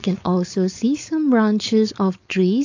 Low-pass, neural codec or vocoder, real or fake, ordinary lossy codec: 7.2 kHz; codec, 16 kHz, 4.8 kbps, FACodec; fake; AAC, 48 kbps